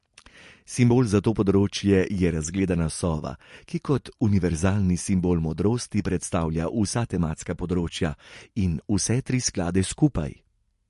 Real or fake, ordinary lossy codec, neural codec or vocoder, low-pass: real; MP3, 48 kbps; none; 14.4 kHz